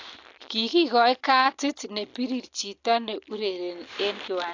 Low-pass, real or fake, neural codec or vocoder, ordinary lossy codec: 7.2 kHz; real; none; AAC, 48 kbps